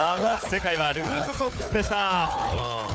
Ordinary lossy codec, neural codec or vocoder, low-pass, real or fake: none; codec, 16 kHz, 4 kbps, FunCodec, trained on Chinese and English, 50 frames a second; none; fake